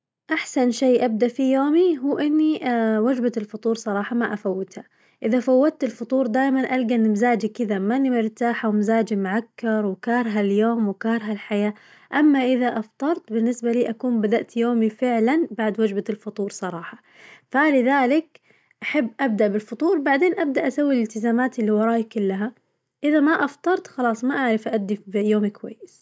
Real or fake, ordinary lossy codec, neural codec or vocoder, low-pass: real; none; none; none